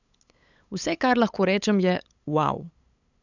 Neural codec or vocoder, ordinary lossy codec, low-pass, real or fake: codec, 16 kHz, 8 kbps, FunCodec, trained on LibriTTS, 25 frames a second; none; 7.2 kHz; fake